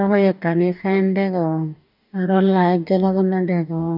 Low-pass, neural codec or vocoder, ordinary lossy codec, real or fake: 5.4 kHz; codec, 44.1 kHz, 2.6 kbps, DAC; none; fake